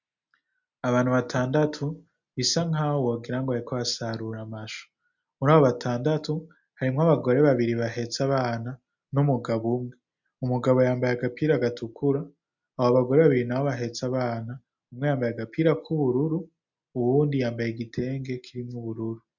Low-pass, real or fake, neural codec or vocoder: 7.2 kHz; real; none